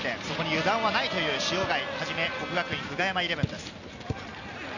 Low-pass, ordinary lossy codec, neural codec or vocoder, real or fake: 7.2 kHz; none; none; real